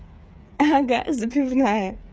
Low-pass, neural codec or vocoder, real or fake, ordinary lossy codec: none; codec, 16 kHz, 8 kbps, FreqCodec, smaller model; fake; none